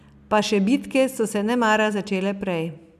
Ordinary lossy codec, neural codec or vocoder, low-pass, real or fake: none; none; 14.4 kHz; real